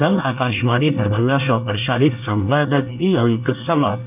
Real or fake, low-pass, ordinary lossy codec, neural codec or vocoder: fake; 3.6 kHz; none; codec, 24 kHz, 1 kbps, SNAC